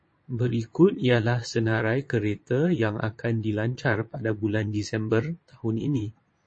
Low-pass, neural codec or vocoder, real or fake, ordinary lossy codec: 9.9 kHz; vocoder, 22.05 kHz, 80 mel bands, Vocos; fake; MP3, 32 kbps